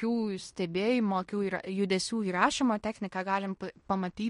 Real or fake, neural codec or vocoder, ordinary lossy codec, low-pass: fake; codec, 16 kHz in and 24 kHz out, 0.9 kbps, LongCat-Audio-Codec, fine tuned four codebook decoder; MP3, 48 kbps; 10.8 kHz